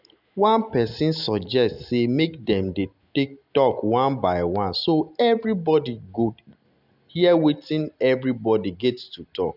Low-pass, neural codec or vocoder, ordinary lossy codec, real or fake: 5.4 kHz; none; none; real